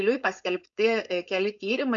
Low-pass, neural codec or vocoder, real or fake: 7.2 kHz; codec, 16 kHz, 4.8 kbps, FACodec; fake